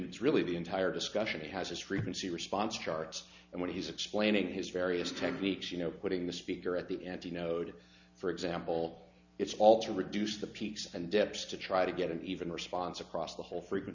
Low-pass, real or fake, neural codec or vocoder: 7.2 kHz; real; none